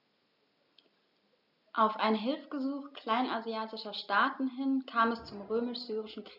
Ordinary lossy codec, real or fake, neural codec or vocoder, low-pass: none; real; none; 5.4 kHz